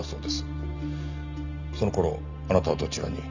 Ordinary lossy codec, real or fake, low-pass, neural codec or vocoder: none; real; 7.2 kHz; none